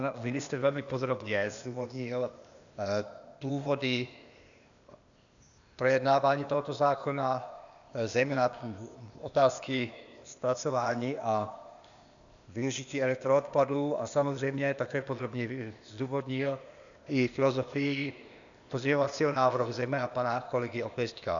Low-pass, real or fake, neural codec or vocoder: 7.2 kHz; fake; codec, 16 kHz, 0.8 kbps, ZipCodec